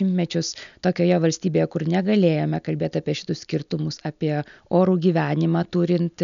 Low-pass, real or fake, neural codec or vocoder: 7.2 kHz; real; none